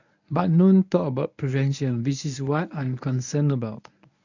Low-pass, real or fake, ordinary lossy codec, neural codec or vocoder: 7.2 kHz; fake; none; codec, 24 kHz, 0.9 kbps, WavTokenizer, medium speech release version 1